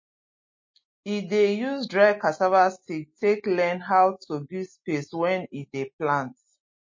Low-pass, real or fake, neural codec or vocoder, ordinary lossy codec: 7.2 kHz; real; none; MP3, 32 kbps